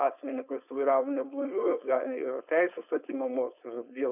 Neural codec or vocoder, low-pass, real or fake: codec, 16 kHz, 4.8 kbps, FACodec; 3.6 kHz; fake